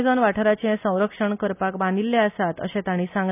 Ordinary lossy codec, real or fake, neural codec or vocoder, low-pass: none; real; none; 3.6 kHz